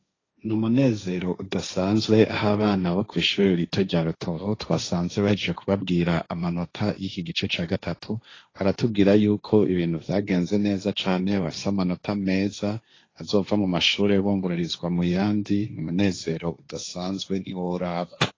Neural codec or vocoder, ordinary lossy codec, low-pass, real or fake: codec, 16 kHz, 1.1 kbps, Voila-Tokenizer; AAC, 32 kbps; 7.2 kHz; fake